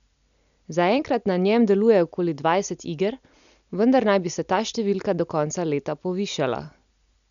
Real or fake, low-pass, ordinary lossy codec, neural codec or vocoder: real; 7.2 kHz; none; none